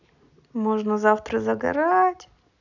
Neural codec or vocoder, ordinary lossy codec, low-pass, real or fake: none; none; 7.2 kHz; real